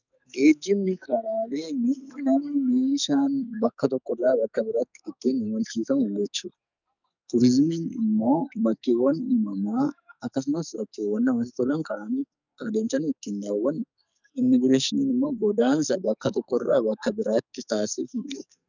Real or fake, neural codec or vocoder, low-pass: fake; codec, 44.1 kHz, 2.6 kbps, SNAC; 7.2 kHz